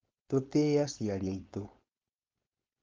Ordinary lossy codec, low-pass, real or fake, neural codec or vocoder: Opus, 24 kbps; 7.2 kHz; fake; codec, 16 kHz, 4.8 kbps, FACodec